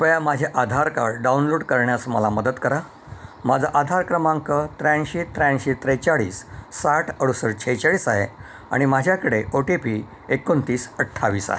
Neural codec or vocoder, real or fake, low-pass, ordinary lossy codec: none; real; none; none